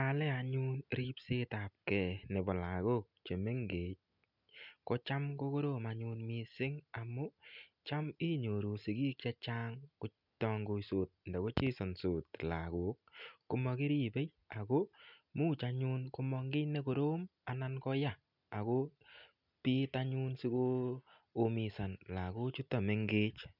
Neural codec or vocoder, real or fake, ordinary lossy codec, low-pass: none; real; none; 5.4 kHz